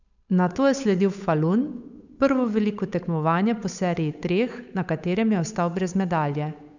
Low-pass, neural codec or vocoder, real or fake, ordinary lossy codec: 7.2 kHz; codec, 16 kHz, 8 kbps, FunCodec, trained on Chinese and English, 25 frames a second; fake; none